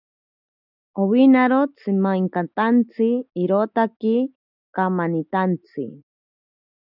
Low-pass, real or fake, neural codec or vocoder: 5.4 kHz; real; none